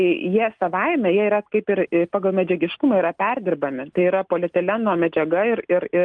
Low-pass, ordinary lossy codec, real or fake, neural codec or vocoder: 9.9 kHz; Opus, 32 kbps; real; none